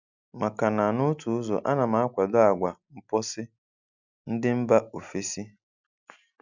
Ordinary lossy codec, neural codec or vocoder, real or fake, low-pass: none; none; real; 7.2 kHz